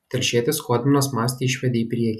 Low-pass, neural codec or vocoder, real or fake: 14.4 kHz; none; real